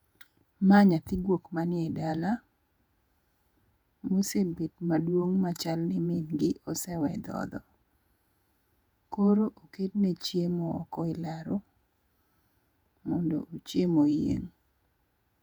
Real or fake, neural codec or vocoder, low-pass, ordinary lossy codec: fake; vocoder, 48 kHz, 128 mel bands, Vocos; 19.8 kHz; none